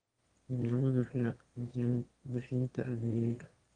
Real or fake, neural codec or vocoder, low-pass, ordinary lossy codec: fake; autoencoder, 22.05 kHz, a latent of 192 numbers a frame, VITS, trained on one speaker; 9.9 kHz; Opus, 24 kbps